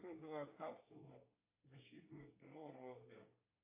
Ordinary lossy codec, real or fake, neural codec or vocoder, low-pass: AAC, 32 kbps; fake; codec, 24 kHz, 1 kbps, SNAC; 3.6 kHz